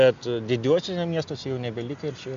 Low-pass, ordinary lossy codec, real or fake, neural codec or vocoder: 7.2 kHz; MP3, 64 kbps; real; none